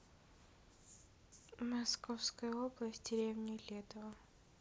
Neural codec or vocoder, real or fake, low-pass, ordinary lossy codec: none; real; none; none